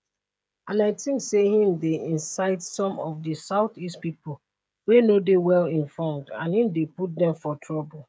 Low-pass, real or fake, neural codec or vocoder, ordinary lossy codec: none; fake; codec, 16 kHz, 16 kbps, FreqCodec, smaller model; none